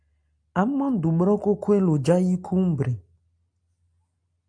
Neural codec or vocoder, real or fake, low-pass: none; real; 9.9 kHz